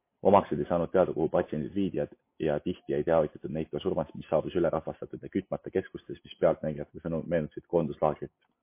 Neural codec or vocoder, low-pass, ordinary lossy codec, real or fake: none; 3.6 kHz; MP3, 24 kbps; real